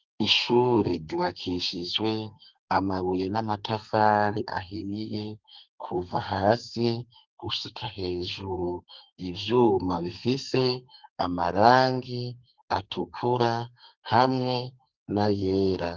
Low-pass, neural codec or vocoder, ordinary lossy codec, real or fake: 7.2 kHz; codec, 32 kHz, 1.9 kbps, SNAC; Opus, 32 kbps; fake